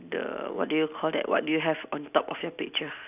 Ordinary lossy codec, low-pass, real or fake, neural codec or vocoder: none; 3.6 kHz; real; none